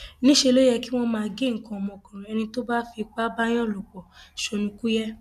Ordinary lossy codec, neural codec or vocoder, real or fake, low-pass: none; none; real; 14.4 kHz